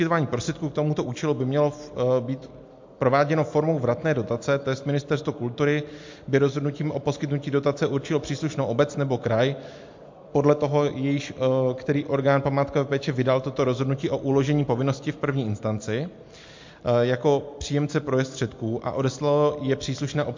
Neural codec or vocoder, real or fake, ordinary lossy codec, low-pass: none; real; MP3, 48 kbps; 7.2 kHz